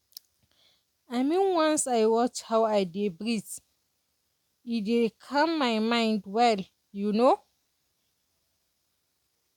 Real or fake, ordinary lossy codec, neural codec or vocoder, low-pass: real; none; none; 19.8 kHz